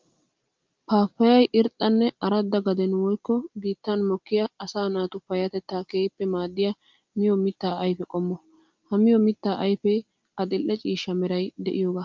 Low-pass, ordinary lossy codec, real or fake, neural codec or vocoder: 7.2 kHz; Opus, 24 kbps; real; none